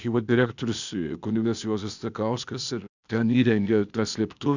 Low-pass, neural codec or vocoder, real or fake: 7.2 kHz; codec, 16 kHz, 0.8 kbps, ZipCodec; fake